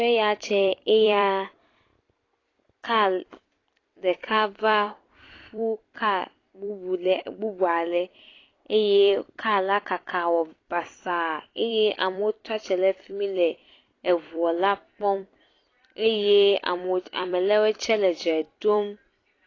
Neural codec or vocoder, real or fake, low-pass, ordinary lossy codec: vocoder, 44.1 kHz, 128 mel bands every 256 samples, BigVGAN v2; fake; 7.2 kHz; AAC, 32 kbps